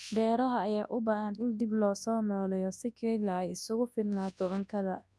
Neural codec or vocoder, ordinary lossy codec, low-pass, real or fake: codec, 24 kHz, 0.9 kbps, WavTokenizer, large speech release; none; none; fake